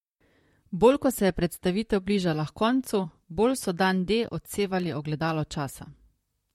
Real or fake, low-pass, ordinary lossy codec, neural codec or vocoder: fake; 19.8 kHz; MP3, 64 kbps; vocoder, 44.1 kHz, 128 mel bands, Pupu-Vocoder